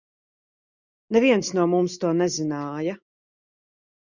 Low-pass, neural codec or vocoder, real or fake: 7.2 kHz; none; real